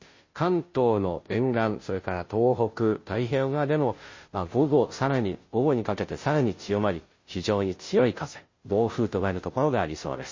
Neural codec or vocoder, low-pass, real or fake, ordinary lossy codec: codec, 16 kHz, 0.5 kbps, FunCodec, trained on Chinese and English, 25 frames a second; 7.2 kHz; fake; MP3, 32 kbps